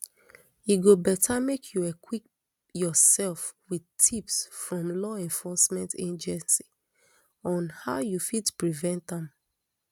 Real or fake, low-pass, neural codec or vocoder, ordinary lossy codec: real; 19.8 kHz; none; none